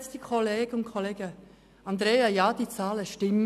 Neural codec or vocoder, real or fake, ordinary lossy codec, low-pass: none; real; none; 14.4 kHz